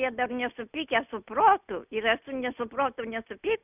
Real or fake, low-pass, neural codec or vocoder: real; 3.6 kHz; none